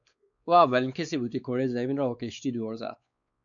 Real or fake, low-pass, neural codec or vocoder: fake; 7.2 kHz; codec, 16 kHz, 4 kbps, X-Codec, WavLM features, trained on Multilingual LibriSpeech